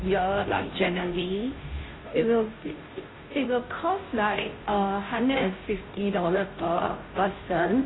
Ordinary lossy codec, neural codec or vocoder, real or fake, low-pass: AAC, 16 kbps; codec, 16 kHz, 0.5 kbps, FunCodec, trained on Chinese and English, 25 frames a second; fake; 7.2 kHz